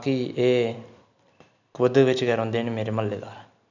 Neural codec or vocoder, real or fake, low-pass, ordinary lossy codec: none; real; 7.2 kHz; none